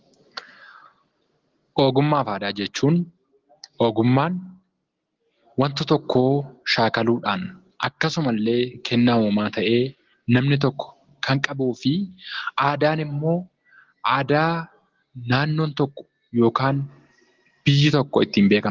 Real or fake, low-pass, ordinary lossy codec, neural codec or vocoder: real; 7.2 kHz; Opus, 16 kbps; none